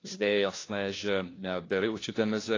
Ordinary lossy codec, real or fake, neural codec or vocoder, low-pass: AAC, 32 kbps; fake; codec, 16 kHz, 1 kbps, FunCodec, trained on LibriTTS, 50 frames a second; 7.2 kHz